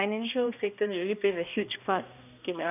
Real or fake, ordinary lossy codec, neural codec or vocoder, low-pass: fake; none; codec, 16 kHz, 1 kbps, X-Codec, HuBERT features, trained on balanced general audio; 3.6 kHz